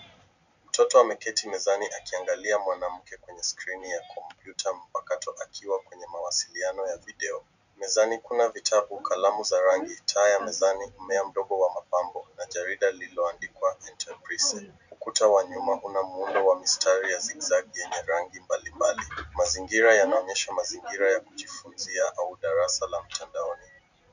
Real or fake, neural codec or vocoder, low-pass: real; none; 7.2 kHz